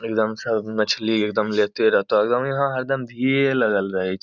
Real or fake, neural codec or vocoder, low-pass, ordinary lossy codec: real; none; 7.2 kHz; none